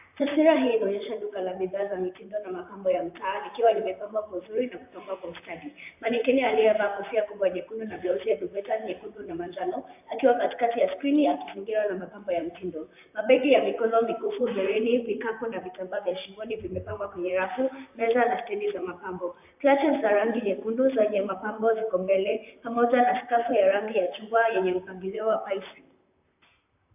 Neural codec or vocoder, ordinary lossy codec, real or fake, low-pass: vocoder, 44.1 kHz, 128 mel bands, Pupu-Vocoder; AAC, 32 kbps; fake; 3.6 kHz